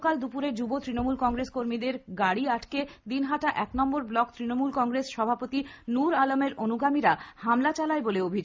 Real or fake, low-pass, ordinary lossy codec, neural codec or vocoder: real; none; none; none